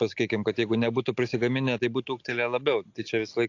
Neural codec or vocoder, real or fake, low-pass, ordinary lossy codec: none; real; 7.2 kHz; AAC, 48 kbps